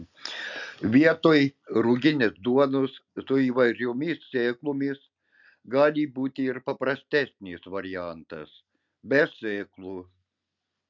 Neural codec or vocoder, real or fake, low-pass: none; real; 7.2 kHz